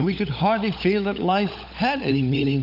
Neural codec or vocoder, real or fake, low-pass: codec, 16 kHz, 16 kbps, FunCodec, trained on LibriTTS, 50 frames a second; fake; 5.4 kHz